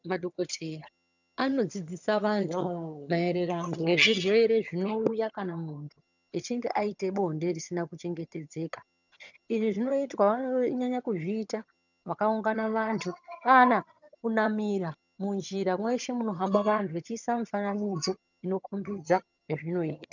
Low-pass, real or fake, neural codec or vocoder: 7.2 kHz; fake; vocoder, 22.05 kHz, 80 mel bands, HiFi-GAN